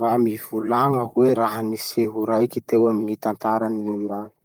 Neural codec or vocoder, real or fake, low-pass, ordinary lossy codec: vocoder, 44.1 kHz, 128 mel bands, Pupu-Vocoder; fake; 19.8 kHz; Opus, 32 kbps